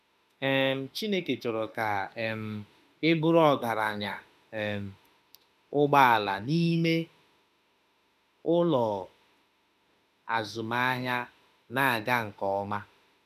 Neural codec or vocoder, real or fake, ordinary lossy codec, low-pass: autoencoder, 48 kHz, 32 numbers a frame, DAC-VAE, trained on Japanese speech; fake; none; 14.4 kHz